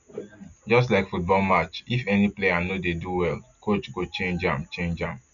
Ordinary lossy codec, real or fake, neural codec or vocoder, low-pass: none; real; none; 7.2 kHz